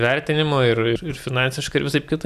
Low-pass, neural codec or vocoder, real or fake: 14.4 kHz; none; real